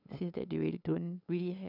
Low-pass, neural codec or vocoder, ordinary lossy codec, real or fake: 5.4 kHz; codec, 16 kHz, 8 kbps, FunCodec, trained on LibriTTS, 25 frames a second; none; fake